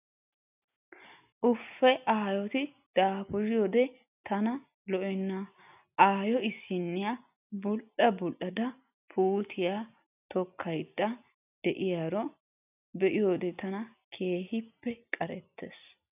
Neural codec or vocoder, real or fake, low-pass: none; real; 3.6 kHz